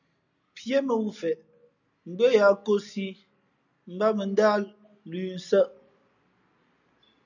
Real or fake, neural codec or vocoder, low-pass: real; none; 7.2 kHz